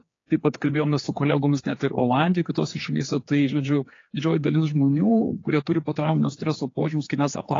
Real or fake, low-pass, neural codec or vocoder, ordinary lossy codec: fake; 7.2 kHz; codec, 16 kHz, 4 kbps, X-Codec, HuBERT features, trained on general audio; AAC, 32 kbps